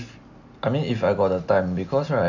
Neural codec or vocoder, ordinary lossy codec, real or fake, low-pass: none; none; real; 7.2 kHz